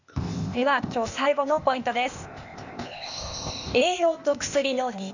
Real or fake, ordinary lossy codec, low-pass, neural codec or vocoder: fake; none; 7.2 kHz; codec, 16 kHz, 0.8 kbps, ZipCodec